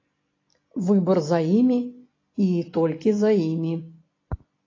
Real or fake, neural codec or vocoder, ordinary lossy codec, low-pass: real; none; AAC, 32 kbps; 7.2 kHz